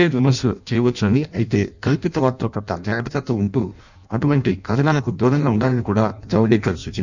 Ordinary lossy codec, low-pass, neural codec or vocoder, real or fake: none; 7.2 kHz; codec, 16 kHz in and 24 kHz out, 0.6 kbps, FireRedTTS-2 codec; fake